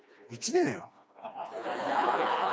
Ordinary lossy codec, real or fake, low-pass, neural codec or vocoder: none; fake; none; codec, 16 kHz, 2 kbps, FreqCodec, smaller model